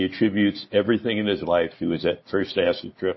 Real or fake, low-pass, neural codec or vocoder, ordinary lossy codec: real; 7.2 kHz; none; MP3, 24 kbps